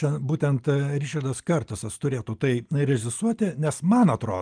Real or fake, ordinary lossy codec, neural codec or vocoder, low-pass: real; Opus, 32 kbps; none; 9.9 kHz